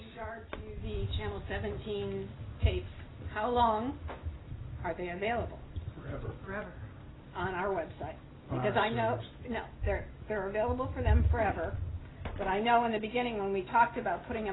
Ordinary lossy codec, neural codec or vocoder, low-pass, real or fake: AAC, 16 kbps; none; 7.2 kHz; real